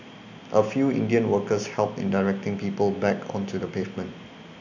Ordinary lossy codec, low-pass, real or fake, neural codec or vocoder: none; 7.2 kHz; real; none